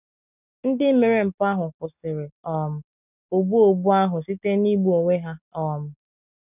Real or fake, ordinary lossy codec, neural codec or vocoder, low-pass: real; none; none; 3.6 kHz